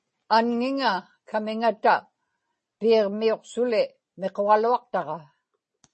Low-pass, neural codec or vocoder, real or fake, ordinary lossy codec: 10.8 kHz; none; real; MP3, 32 kbps